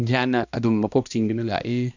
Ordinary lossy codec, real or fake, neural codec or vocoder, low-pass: none; fake; codec, 16 kHz, 1 kbps, X-Codec, HuBERT features, trained on balanced general audio; 7.2 kHz